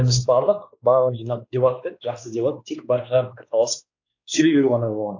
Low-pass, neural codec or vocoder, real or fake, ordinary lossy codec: 7.2 kHz; codec, 16 kHz, 2 kbps, X-Codec, WavLM features, trained on Multilingual LibriSpeech; fake; AAC, 48 kbps